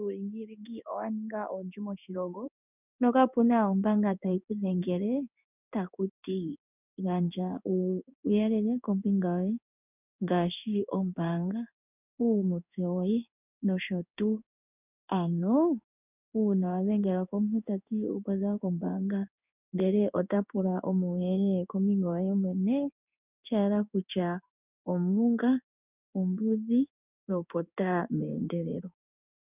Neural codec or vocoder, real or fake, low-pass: codec, 16 kHz in and 24 kHz out, 1 kbps, XY-Tokenizer; fake; 3.6 kHz